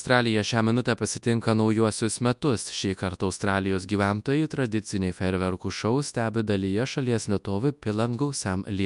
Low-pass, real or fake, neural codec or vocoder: 10.8 kHz; fake; codec, 24 kHz, 0.9 kbps, WavTokenizer, large speech release